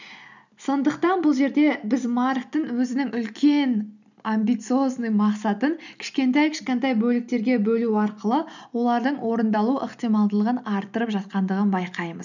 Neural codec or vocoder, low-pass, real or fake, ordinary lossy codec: none; 7.2 kHz; real; none